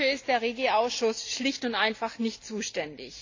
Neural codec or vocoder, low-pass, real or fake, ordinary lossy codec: none; 7.2 kHz; real; AAC, 48 kbps